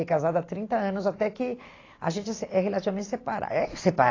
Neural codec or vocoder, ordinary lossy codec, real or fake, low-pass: none; AAC, 32 kbps; real; 7.2 kHz